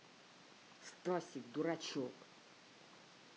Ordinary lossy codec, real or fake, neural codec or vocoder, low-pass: none; real; none; none